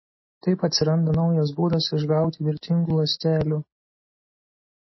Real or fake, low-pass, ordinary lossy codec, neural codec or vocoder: real; 7.2 kHz; MP3, 24 kbps; none